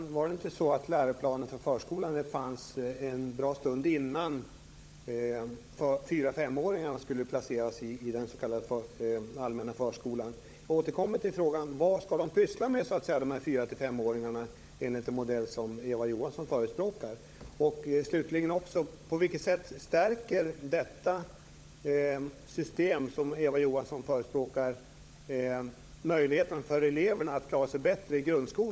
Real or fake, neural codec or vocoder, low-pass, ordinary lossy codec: fake; codec, 16 kHz, 16 kbps, FunCodec, trained on LibriTTS, 50 frames a second; none; none